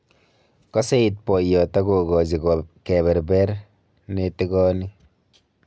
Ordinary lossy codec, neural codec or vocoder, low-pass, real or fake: none; none; none; real